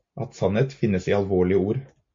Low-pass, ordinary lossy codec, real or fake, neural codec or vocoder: 7.2 kHz; MP3, 48 kbps; real; none